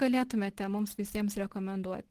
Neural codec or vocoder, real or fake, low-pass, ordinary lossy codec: none; real; 14.4 kHz; Opus, 16 kbps